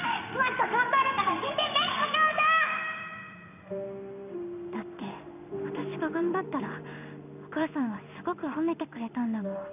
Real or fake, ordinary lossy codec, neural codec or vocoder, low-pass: fake; none; codec, 16 kHz in and 24 kHz out, 1 kbps, XY-Tokenizer; 3.6 kHz